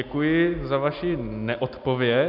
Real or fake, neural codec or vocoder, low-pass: real; none; 5.4 kHz